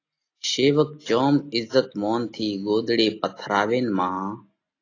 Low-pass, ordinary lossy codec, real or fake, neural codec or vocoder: 7.2 kHz; AAC, 32 kbps; real; none